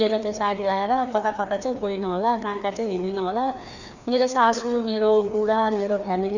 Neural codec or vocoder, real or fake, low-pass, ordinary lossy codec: codec, 16 kHz, 2 kbps, FreqCodec, larger model; fake; 7.2 kHz; none